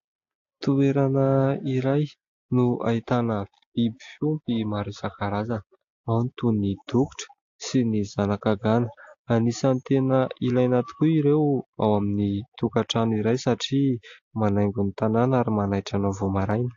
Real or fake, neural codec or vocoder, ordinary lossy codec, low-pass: real; none; AAC, 48 kbps; 7.2 kHz